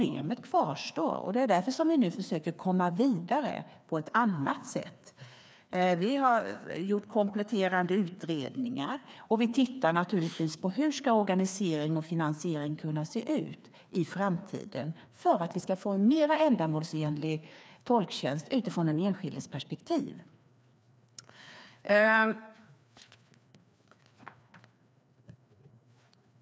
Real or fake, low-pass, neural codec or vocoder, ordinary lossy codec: fake; none; codec, 16 kHz, 2 kbps, FreqCodec, larger model; none